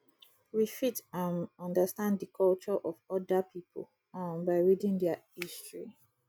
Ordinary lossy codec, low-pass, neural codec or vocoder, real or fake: none; none; none; real